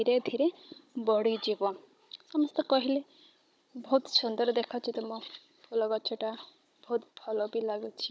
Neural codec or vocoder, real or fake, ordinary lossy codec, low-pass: codec, 16 kHz, 16 kbps, FunCodec, trained on Chinese and English, 50 frames a second; fake; none; none